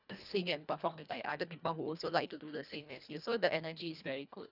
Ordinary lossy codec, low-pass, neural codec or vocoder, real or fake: none; 5.4 kHz; codec, 24 kHz, 1.5 kbps, HILCodec; fake